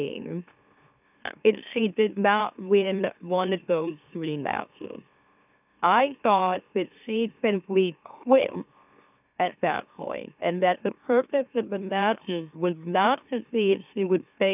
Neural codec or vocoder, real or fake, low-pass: autoencoder, 44.1 kHz, a latent of 192 numbers a frame, MeloTTS; fake; 3.6 kHz